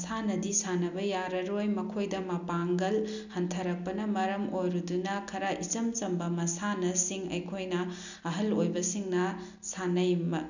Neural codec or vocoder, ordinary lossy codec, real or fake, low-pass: none; none; real; 7.2 kHz